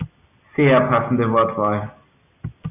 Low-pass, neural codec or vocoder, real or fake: 3.6 kHz; none; real